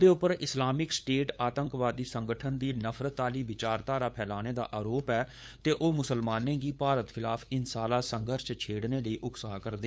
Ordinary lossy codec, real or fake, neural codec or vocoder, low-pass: none; fake; codec, 16 kHz, 8 kbps, FunCodec, trained on LibriTTS, 25 frames a second; none